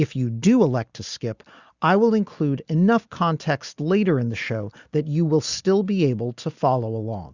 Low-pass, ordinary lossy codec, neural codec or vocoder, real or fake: 7.2 kHz; Opus, 64 kbps; none; real